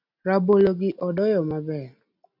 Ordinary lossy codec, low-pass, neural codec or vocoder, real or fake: MP3, 48 kbps; 5.4 kHz; none; real